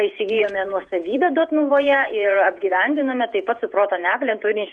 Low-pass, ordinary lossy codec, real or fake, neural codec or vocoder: 9.9 kHz; Opus, 64 kbps; fake; vocoder, 48 kHz, 128 mel bands, Vocos